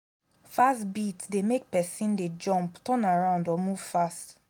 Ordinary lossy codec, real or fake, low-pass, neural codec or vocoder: none; real; 19.8 kHz; none